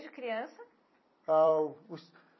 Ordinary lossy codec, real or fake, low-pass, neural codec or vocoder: MP3, 24 kbps; fake; 7.2 kHz; vocoder, 44.1 kHz, 128 mel bands every 256 samples, BigVGAN v2